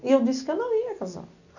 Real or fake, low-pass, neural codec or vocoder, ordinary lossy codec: real; 7.2 kHz; none; none